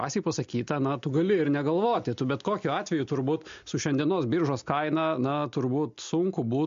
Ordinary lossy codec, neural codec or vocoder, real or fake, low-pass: MP3, 48 kbps; none; real; 7.2 kHz